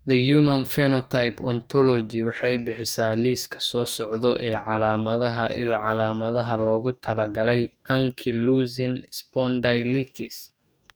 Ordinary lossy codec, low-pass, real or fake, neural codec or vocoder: none; none; fake; codec, 44.1 kHz, 2.6 kbps, DAC